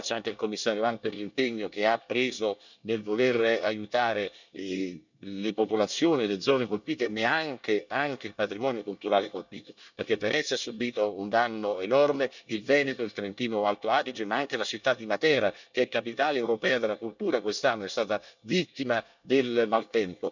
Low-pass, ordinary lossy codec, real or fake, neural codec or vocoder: 7.2 kHz; none; fake; codec, 24 kHz, 1 kbps, SNAC